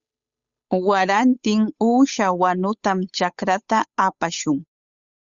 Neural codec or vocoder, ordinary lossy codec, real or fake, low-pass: codec, 16 kHz, 8 kbps, FunCodec, trained on Chinese and English, 25 frames a second; Opus, 64 kbps; fake; 7.2 kHz